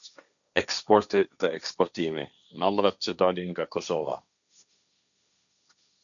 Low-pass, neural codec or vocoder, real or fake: 7.2 kHz; codec, 16 kHz, 1.1 kbps, Voila-Tokenizer; fake